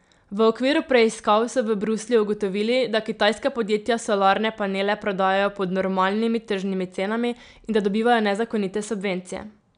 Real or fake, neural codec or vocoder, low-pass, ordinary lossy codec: real; none; 9.9 kHz; none